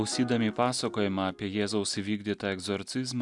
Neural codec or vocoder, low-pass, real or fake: none; 10.8 kHz; real